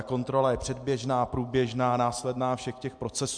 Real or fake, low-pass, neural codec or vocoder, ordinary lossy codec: real; 9.9 kHz; none; MP3, 64 kbps